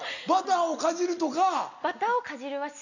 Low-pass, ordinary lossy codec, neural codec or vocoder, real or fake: 7.2 kHz; none; none; real